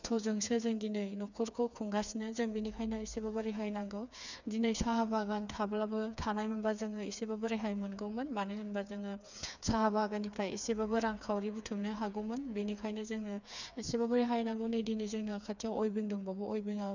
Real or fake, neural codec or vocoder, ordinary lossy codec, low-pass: fake; codec, 16 kHz, 4 kbps, FreqCodec, smaller model; none; 7.2 kHz